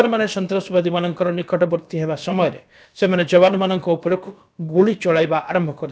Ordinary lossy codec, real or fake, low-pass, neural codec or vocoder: none; fake; none; codec, 16 kHz, about 1 kbps, DyCAST, with the encoder's durations